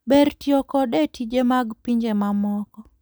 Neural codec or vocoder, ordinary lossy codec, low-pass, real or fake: none; none; none; real